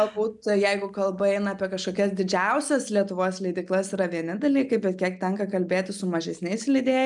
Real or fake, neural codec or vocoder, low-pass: real; none; 10.8 kHz